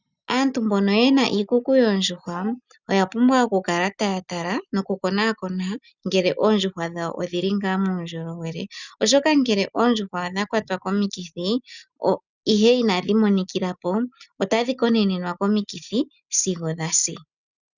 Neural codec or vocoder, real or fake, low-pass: none; real; 7.2 kHz